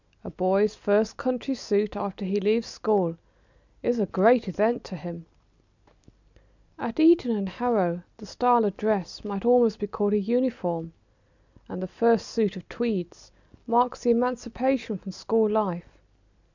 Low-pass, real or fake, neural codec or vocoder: 7.2 kHz; real; none